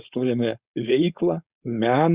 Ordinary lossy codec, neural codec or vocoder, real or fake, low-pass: Opus, 64 kbps; codec, 16 kHz, 4.8 kbps, FACodec; fake; 3.6 kHz